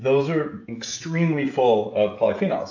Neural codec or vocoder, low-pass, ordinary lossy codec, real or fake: codec, 16 kHz, 16 kbps, FreqCodec, smaller model; 7.2 kHz; AAC, 48 kbps; fake